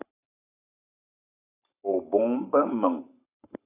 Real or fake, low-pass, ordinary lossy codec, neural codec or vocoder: fake; 3.6 kHz; AAC, 24 kbps; codec, 44.1 kHz, 7.8 kbps, Pupu-Codec